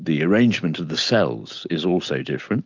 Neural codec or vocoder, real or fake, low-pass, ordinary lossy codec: none; real; 7.2 kHz; Opus, 24 kbps